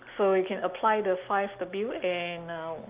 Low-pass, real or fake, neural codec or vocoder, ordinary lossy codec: 3.6 kHz; real; none; Opus, 24 kbps